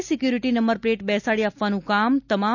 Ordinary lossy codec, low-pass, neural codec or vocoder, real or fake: none; 7.2 kHz; none; real